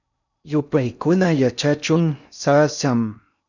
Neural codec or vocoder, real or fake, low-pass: codec, 16 kHz in and 24 kHz out, 0.6 kbps, FocalCodec, streaming, 4096 codes; fake; 7.2 kHz